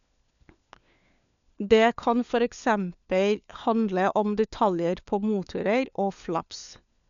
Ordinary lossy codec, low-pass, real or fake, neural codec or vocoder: none; 7.2 kHz; fake; codec, 16 kHz, 4 kbps, FunCodec, trained on LibriTTS, 50 frames a second